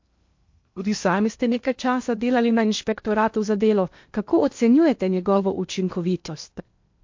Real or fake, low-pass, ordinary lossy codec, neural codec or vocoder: fake; 7.2 kHz; MP3, 48 kbps; codec, 16 kHz in and 24 kHz out, 0.8 kbps, FocalCodec, streaming, 65536 codes